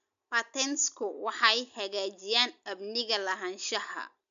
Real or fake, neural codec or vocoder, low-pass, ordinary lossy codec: real; none; 7.2 kHz; none